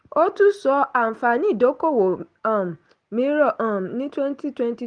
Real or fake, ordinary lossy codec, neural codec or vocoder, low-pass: real; Opus, 24 kbps; none; 9.9 kHz